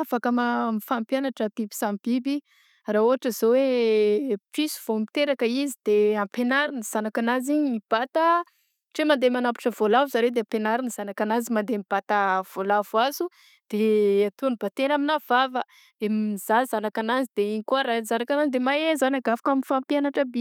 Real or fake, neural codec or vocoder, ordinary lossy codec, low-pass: real; none; none; 19.8 kHz